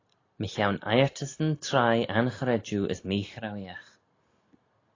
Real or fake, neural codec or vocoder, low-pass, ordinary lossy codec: real; none; 7.2 kHz; AAC, 32 kbps